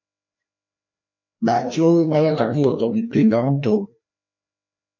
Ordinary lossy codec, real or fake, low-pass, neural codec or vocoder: MP3, 48 kbps; fake; 7.2 kHz; codec, 16 kHz, 1 kbps, FreqCodec, larger model